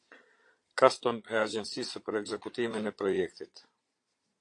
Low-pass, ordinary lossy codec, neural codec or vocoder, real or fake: 9.9 kHz; AAC, 48 kbps; vocoder, 22.05 kHz, 80 mel bands, Vocos; fake